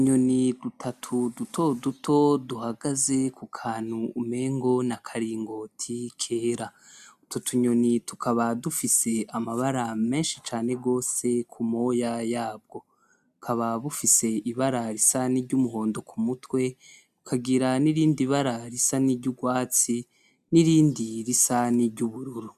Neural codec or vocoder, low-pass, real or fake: none; 14.4 kHz; real